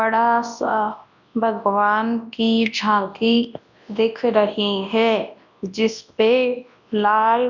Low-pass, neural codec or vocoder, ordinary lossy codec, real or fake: 7.2 kHz; codec, 24 kHz, 0.9 kbps, WavTokenizer, large speech release; none; fake